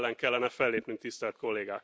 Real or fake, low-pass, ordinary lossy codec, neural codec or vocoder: real; none; none; none